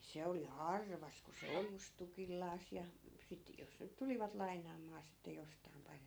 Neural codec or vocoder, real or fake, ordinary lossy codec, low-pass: none; real; none; none